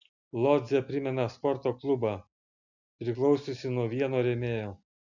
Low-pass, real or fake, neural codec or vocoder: 7.2 kHz; real; none